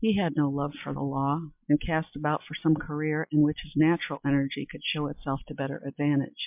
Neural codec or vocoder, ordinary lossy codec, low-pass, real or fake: none; MP3, 32 kbps; 3.6 kHz; real